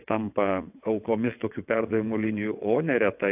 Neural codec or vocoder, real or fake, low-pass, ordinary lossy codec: vocoder, 22.05 kHz, 80 mel bands, WaveNeXt; fake; 3.6 kHz; AAC, 32 kbps